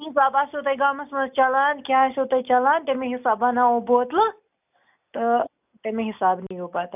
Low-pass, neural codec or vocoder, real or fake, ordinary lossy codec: 3.6 kHz; none; real; none